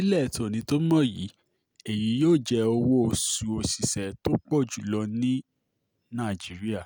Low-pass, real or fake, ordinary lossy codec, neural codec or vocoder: none; real; none; none